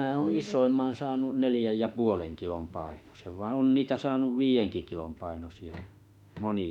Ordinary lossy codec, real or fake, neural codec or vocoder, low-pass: none; fake; autoencoder, 48 kHz, 32 numbers a frame, DAC-VAE, trained on Japanese speech; 19.8 kHz